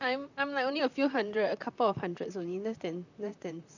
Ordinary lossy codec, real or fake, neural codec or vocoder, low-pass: none; fake; vocoder, 44.1 kHz, 128 mel bands, Pupu-Vocoder; 7.2 kHz